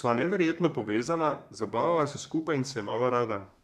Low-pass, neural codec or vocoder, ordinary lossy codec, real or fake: 14.4 kHz; codec, 32 kHz, 1.9 kbps, SNAC; none; fake